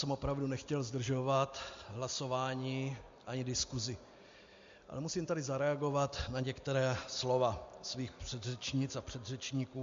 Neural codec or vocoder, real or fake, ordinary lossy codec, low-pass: none; real; MP3, 48 kbps; 7.2 kHz